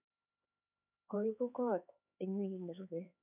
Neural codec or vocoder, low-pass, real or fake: codec, 16 kHz, 4 kbps, X-Codec, HuBERT features, trained on LibriSpeech; 3.6 kHz; fake